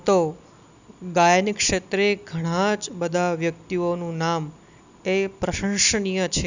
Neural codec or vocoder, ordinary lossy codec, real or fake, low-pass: none; none; real; 7.2 kHz